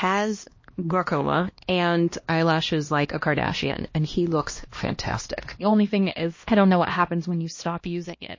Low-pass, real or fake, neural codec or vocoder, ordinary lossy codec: 7.2 kHz; fake; codec, 16 kHz, 1 kbps, X-Codec, HuBERT features, trained on LibriSpeech; MP3, 32 kbps